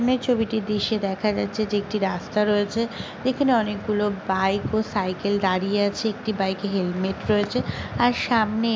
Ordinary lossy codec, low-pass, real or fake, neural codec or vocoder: none; none; real; none